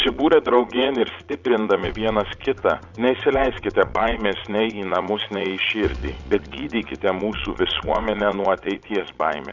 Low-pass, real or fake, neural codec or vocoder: 7.2 kHz; fake; vocoder, 22.05 kHz, 80 mel bands, Vocos